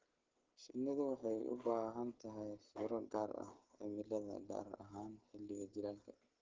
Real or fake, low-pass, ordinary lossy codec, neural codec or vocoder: fake; 7.2 kHz; Opus, 16 kbps; codec, 16 kHz, 16 kbps, FreqCodec, smaller model